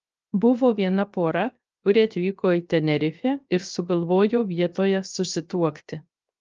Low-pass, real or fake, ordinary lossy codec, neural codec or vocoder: 7.2 kHz; fake; Opus, 24 kbps; codec, 16 kHz, 0.7 kbps, FocalCodec